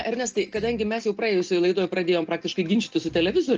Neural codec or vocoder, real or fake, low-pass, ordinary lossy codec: none; real; 7.2 kHz; Opus, 24 kbps